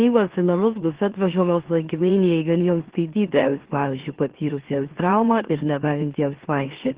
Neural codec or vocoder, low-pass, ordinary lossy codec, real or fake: autoencoder, 44.1 kHz, a latent of 192 numbers a frame, MeloTTS; 3.6 kHz; Opus, 16 kbps; fake